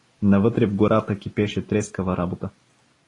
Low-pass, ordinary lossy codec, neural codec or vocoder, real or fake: 10.8 kHz; AAC, 32 kbps; none; real